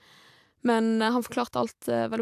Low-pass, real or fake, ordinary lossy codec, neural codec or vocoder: 14.4 kHz; fake; AAC, 96 kbps; vocoder, 44.1 kHz, 128 mel bands every 256 samples, BigVGAN v2